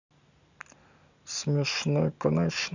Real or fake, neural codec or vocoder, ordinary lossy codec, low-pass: real; none; none; 7.2 kHz